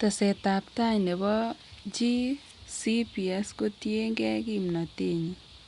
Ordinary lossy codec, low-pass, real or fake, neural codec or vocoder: Opus, 64 kbps; 10.8 kHz; real; none